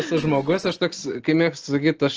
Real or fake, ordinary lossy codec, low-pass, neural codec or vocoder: real; Opus, 16 kbps; 7.2 kHz; none